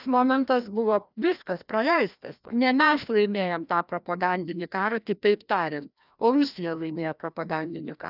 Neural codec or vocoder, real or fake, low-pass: codec, 16 kHz, 1 kbps, FreqCodec, larger model; fake; 5.4 kHz